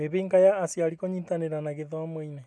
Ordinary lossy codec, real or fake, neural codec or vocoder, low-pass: none; real; none; none